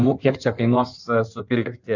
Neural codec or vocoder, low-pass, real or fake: codec, 16 kHz in and 24 kHz out, 1.1 kbps, FireRedTTS-2 codec; 7.2 kHz; fake